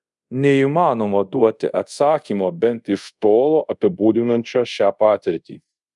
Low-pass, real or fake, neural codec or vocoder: 10.8 kHz; fake; codec, 24 kHz, 0.5 kbps, DualCodec